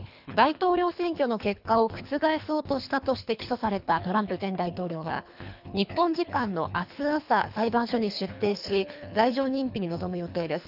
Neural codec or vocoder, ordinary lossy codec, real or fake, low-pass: codec, 24 kHz, 3 kbps, HILCodec; none; fake; 5.4 kHz